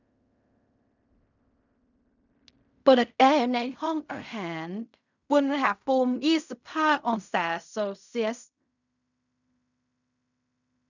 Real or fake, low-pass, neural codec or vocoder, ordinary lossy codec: fake; 7.2 kHz; codec, 16 kHz in and 24 kHz out, 0.4 kbps, LongCat-Audio-Codec, fine tuned four codebook decoder; none